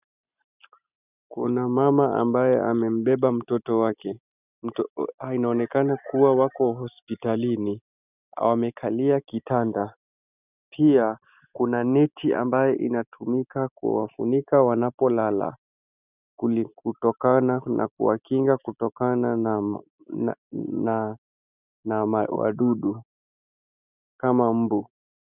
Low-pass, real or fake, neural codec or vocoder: 3.6 kHz; real; none